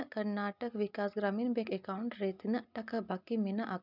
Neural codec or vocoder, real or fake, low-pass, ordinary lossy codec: none; real; 5.4 kHz; none